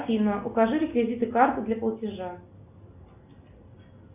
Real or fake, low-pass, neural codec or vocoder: real; 3.6 kHz; none